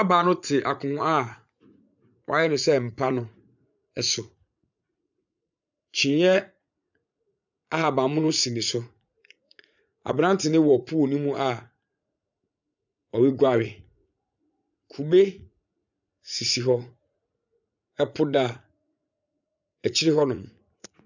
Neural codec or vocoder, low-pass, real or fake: vocoder, 44.1 kHz, 80 mel bands, Vocos; 7.2 kHz; fake